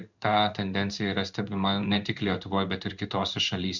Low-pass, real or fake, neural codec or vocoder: 7.2 kHz; fake; codec, 16 kHz in and 24 kHz out, 1 kbps, XY-Tokenizer